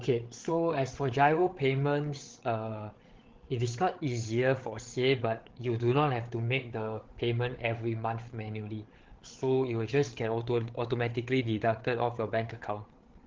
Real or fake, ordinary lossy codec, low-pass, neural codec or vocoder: fake; Opus, 16 kbps; 7.2 kHz; codec, 16 kHz, 8 kbps, FreqCodec, larger model